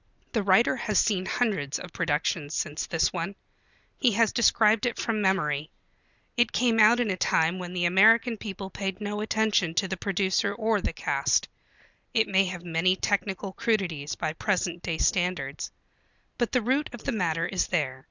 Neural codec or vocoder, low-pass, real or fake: none; 7.2 kHz; real